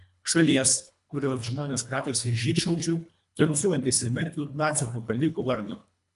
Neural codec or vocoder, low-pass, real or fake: codec, 24 kHz, 1.5 kbps, HILCodec; 10.8 kHz; fake